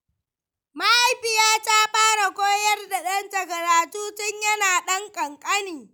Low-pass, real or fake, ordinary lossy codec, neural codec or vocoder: none; real; none; none